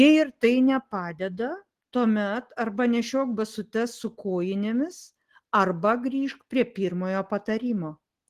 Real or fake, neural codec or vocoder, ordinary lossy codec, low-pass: real; none; Opus, 16 kbps; 14.4 kHz